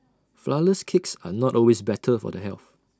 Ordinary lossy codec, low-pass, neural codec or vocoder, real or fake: none; none; none; real